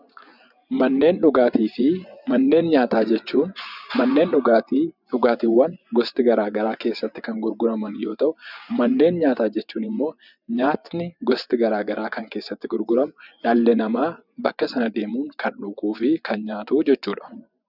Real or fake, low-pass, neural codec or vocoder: fake; 5.4 kHz; vocoder, 24 kHz, 100 mel bands, Vocos